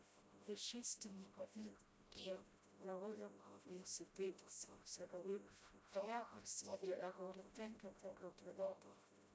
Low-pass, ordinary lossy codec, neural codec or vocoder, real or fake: none; none; codec, 16 kHz, 0.5 kbps, FreqCodec, smaller model; fake